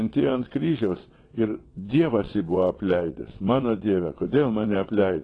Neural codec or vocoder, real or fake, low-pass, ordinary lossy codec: vocoder, 22.05 kHz, 80 mel bands, WaveNeXt; fake; 9.9 kHz; AAC, 32 kbps